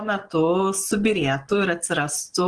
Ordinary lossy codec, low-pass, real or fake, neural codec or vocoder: Opus, 16 kbps; 9.9 kHz; real; none